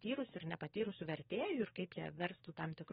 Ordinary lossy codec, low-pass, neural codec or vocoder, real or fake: AAC, 16 kbps; 19.8 kHz; codec, 44.1 kHz, 7.8 kbps, DAC; fake